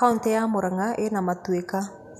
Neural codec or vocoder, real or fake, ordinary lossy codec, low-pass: none; real; none; 14.4 kHz